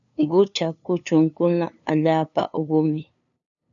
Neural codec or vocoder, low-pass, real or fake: codec, 16 kHz, 2 kbps, FunCodec, trained on LibriTTS, 25 frames a second; 7.2 kHz; fake